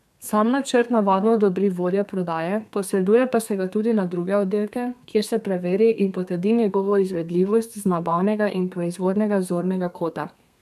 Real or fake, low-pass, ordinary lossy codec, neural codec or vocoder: fake; 14.4 kHz; none; codec, 32 kHz, 1.9 kbps, SNAC